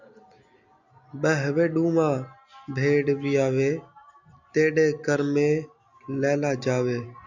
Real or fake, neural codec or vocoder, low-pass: real; none; 7.2 kHz